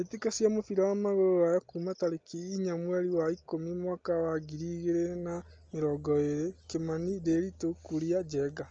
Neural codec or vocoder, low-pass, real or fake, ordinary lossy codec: none; 7.2 kHz; real; Opus, 24 kbps